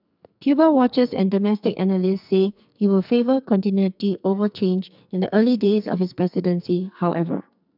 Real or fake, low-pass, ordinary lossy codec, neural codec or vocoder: fake; 5.4 kHz; none; codec, 44.1 kHz, 2.6 kbps, SNAC